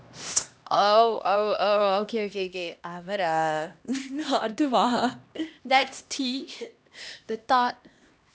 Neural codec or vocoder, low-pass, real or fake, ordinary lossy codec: codec, 16 kHz, 1 kbps, X-Codec, HuBERT features, trained on LibriSpeech; none; fake; none